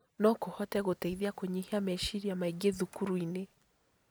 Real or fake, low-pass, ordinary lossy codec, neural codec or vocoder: real; none; none; none